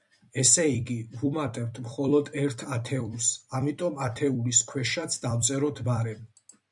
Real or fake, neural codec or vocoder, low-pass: fake; vocoder, 44.1 kHz, 128 mel bands every 256 samples, BigVGAN v2; 10.8 kHz